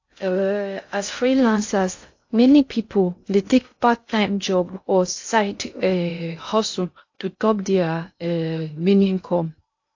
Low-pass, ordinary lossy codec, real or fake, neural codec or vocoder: 7.2 kHz; AAC, 48 kbps; fake; codec, 16 kHz in and 24 kHz out, 0.6 kbps, FocalCodec, streaming, 2048 codes